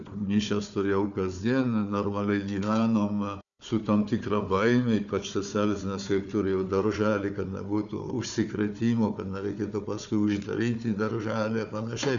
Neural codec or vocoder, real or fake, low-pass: codec, 16 kHz, 4 kbps, FunCodec, trained on Chinese and English, 50 frames a second; fake; 7.2 kHz